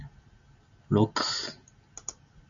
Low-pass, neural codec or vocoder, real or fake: 7.2 kHz; none; real